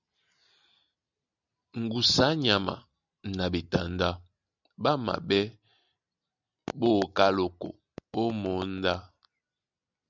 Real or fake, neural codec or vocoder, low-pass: real; none; 7.2 kHz